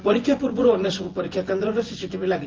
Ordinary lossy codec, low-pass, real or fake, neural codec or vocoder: Opus, 16 kbps; 7.2 kHz; fake; vocoder, 24 kHz, 100 mel bands, Vocos